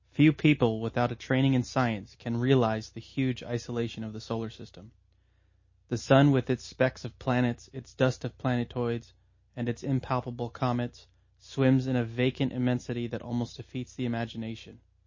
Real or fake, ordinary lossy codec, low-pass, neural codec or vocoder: real; MP3, 32 kbps; 7.2 kHz; none